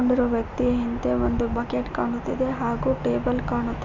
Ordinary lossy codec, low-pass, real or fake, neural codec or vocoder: none; 7.2 kHz; real; none